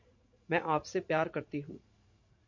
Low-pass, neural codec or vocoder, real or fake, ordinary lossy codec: 7.2 kHz; vocoder, 44.1 kHz, 80 mel bands, Vocos; fake; MP3, 48 kbps